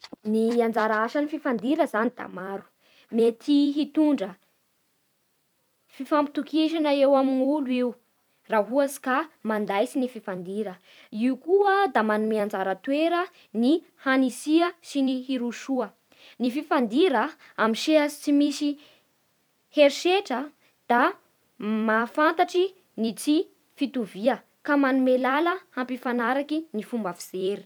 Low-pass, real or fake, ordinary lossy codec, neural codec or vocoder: 19.8 kHz; fake; none; vocoder, 44.1 kHz, 128 mel bands every 256 samples, BigVGAN v2